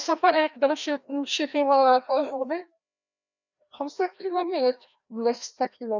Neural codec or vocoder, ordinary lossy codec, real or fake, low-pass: codec, 16 kHz, 1 kbps, FreqCodec, larger model; none; fake; 7.2 kHz